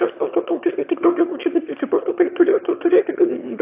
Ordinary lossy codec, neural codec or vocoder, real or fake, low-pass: AAC, 32 kbps; autoencoder, 22.05 kHz, a latent of 192 numbers a frame, VITS, trained on one speaker; fake; 3.6 kHz